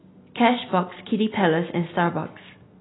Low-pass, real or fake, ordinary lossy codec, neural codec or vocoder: 7.2 kHz; real; AAC, 16 kbps; none